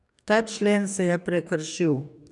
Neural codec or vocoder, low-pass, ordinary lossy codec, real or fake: codec, 44.1 kHz, 2.6 kbps, DAC; 10.8 kHz; none; fake